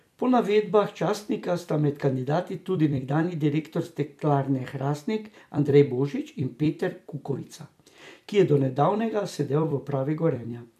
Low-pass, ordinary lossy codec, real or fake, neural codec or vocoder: 14.4 kHz; MP3, 96 kbps; fake; vocoder, 44.1 kHz, 128 mel bands every 256 samples, BigVGAN v2